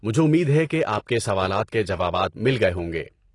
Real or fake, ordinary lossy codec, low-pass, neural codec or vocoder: real; AAC, 32 kbps; 10.8 kHz; none